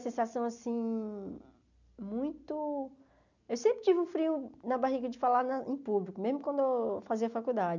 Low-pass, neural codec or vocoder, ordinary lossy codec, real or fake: 7.2 kHz; none; none; real